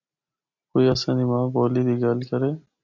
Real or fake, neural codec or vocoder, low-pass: real; none; 7.2 kHz